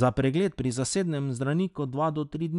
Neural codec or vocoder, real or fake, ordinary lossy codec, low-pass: none; real; none; 10.8 kHz